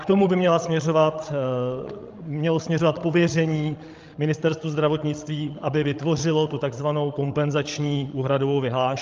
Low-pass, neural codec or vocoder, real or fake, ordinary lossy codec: 7.2 kHz; codec, 16 kHz, 16 kbps, FreqCodec, larger model; fake; Opus, 24 kbps